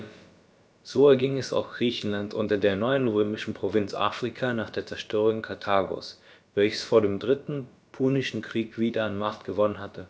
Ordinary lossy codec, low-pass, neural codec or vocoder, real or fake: none; none; codec, 16 kHz, about 1 kbps, DyCAST, with the encoder's durations; fake